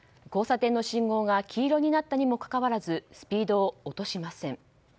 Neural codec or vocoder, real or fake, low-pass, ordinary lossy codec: none; real; none; none